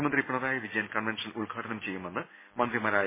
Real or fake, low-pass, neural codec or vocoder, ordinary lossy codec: real; 3.6 kHz; none; MP3, 16 kbps